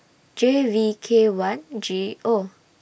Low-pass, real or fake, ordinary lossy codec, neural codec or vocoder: none; real; none; none